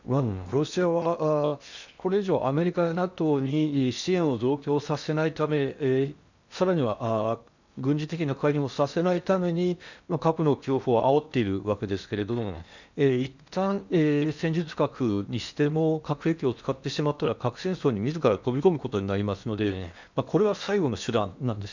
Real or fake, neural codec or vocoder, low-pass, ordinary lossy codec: fake; codec, 16 kHz in and 24 kHz out, 0.8 kbps, FocalCodec, streaming, 65536 codes; 7.2 kHz; none